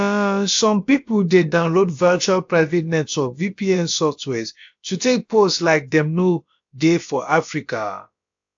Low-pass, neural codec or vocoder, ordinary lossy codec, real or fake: 7.2 kHz; codec, 16 kHz, about 1 kbps, DyCAST, with the encoder's durations; AAC, 64 kbps; fake